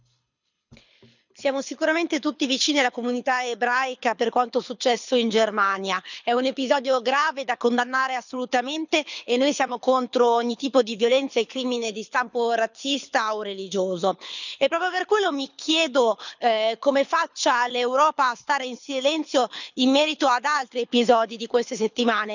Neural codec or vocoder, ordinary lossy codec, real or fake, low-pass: codec, 24 kHz, 6 kbps, HILCodec; none; fake; 7.2 kHz